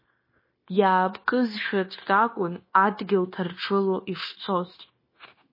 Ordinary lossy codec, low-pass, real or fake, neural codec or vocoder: MP3, 24 kbps; 5.4 kHz; fake; codec, 16 kHz, 0.9 kbps, LongCat-Audio-Codec